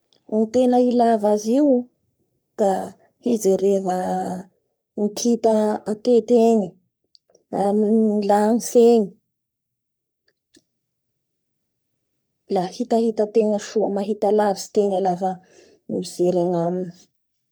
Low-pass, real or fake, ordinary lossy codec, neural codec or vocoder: none; fake; none; codec, 44.1 kHz, 3.4 kbps, Pupu-Codec